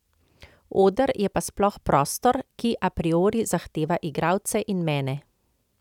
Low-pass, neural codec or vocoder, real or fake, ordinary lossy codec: 19.8 kHz; none; real; none